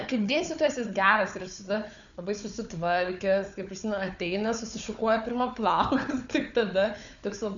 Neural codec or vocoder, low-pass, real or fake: codec, 16 kHz, 4 kbps, FunCodec, trained on Chinese and English, 50 frames a second; 7.2 kHz; fake